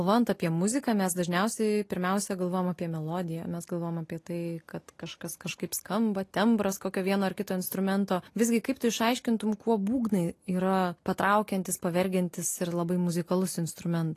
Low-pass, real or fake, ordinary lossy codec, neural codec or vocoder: 14.4 kHz; real; AAC, 48 kbps; none